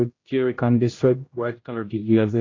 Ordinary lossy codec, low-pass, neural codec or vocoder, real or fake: AAC, 32 kbps; 7.2 kHz; codec, 16 kHz, 0.5 kbps, X-Codec, HuBERT features, trained on general audio; fake